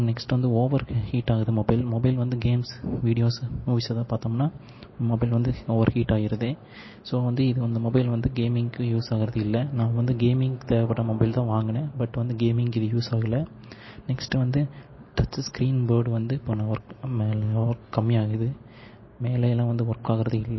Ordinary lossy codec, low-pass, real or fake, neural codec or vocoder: MP3, 24 kbps; 7.2 kHz; real; none